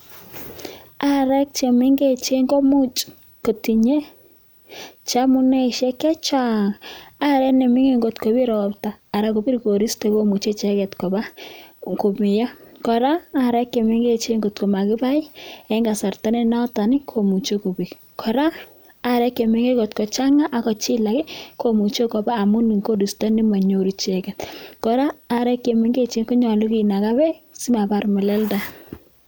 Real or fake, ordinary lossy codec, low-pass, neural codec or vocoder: real; none; none; none